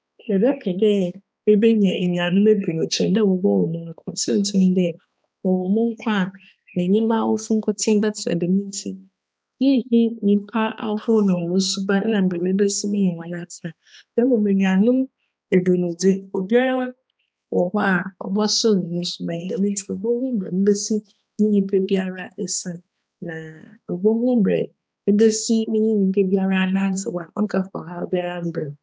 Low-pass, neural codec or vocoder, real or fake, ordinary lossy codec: none; codec, 16 kHz, 2 kbps, X-Codec, HuBERT features, trained on balanced general audio; fake; none